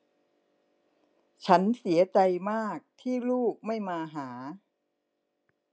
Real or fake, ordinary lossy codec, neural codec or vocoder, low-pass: real; none; none; none